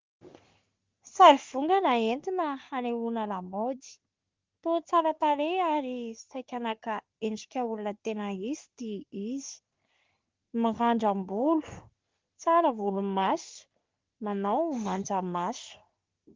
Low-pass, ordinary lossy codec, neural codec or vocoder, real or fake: 7.2 kHz; Opus, 32 kbps; codec, 44.1 kHz, 3.4 kbps, Pupu-Codec; fake